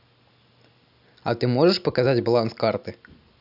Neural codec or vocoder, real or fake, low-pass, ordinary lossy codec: none; real; 5.4 kHz; none